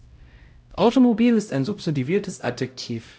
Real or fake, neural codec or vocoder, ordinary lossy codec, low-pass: fake; codec, 16 kHz, 0.5 kbps, X-Codec, HuBERT features, trained on LibriSpeech; none; none